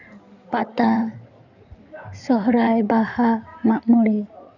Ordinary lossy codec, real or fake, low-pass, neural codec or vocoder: none; fake; 7.2 kHz; codec, 16 kHz in and 24 kHz out, 2.2 kbps, FireRedTTS-2 codec